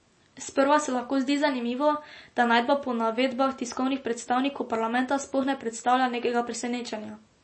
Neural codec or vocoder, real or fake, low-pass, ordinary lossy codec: none; real; 9.9 kHz; MP3, 32 kbps